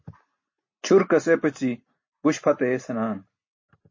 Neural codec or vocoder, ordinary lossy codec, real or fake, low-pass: none; MP3, 32 kbps; real; 7.2 kHz